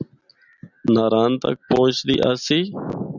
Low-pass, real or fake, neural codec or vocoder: 7.2 kHz; real; none